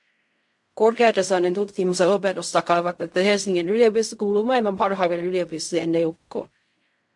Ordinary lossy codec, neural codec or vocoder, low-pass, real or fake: MP3, 64 kbps; codec, 16 kHz in and 24 kHz out, 0.4 kbps, LongCat-Audio-Codec, fine tuned four codebook decoder; 10.8 kHz; fake